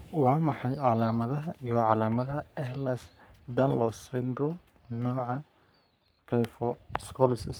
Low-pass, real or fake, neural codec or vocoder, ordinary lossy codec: none; fake; codec, 44.1 kHz, 3.4 kbps, Pupu-Codec; none